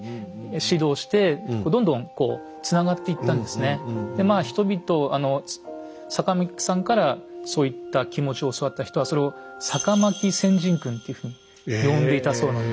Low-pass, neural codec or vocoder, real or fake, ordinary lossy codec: none; none; real; none